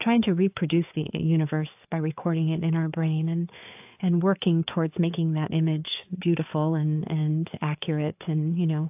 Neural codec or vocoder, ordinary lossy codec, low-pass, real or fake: codec, 16 kHz, 4 kbps, FreqCodec, larger model; AAC, 32 kbps; 3.6 kHz; fake